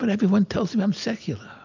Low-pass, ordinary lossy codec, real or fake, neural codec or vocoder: 7.2 kHz; MP3, 64 kbps; real; none